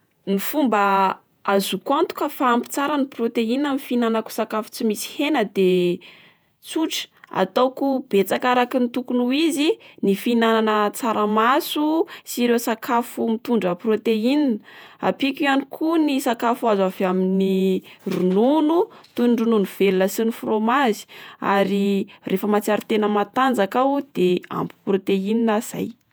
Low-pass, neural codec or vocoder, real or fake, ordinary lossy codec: none; vocoder, 48 kHz, 128 mel bands, Vocos; fake; none